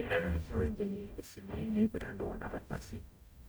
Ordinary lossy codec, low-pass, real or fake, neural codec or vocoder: none; none; fake; codec, 44.1 kHz, 0.9 kbps, DAC